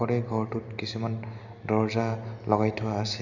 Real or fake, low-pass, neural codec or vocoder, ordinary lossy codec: real; 7.2 kHz; none; none